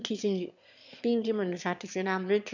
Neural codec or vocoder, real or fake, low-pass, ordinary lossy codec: autoencoder, 22.05 kHz, a latent of 192 numbers a frame, VITS, trained on one speaker; fake; 7.2 kHz; none